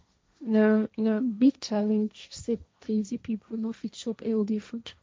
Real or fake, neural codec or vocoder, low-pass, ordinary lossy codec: fake; codec, 16 kHz, 1.1 kbps, Voila-Tokenizer; none; none